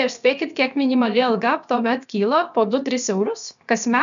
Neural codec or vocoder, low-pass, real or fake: codec, 16 kHz, about 1 kbps, DyCAST, with the encoder's durations; 7.2 kHz; fake